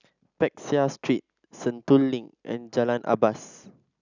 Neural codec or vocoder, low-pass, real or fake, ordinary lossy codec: none; 7.2 kHz; real; none